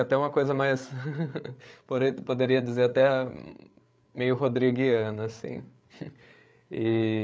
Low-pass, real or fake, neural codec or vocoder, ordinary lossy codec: none; fake; codec, 16 kHz, 8 kbps, FreqCodec, larger model; none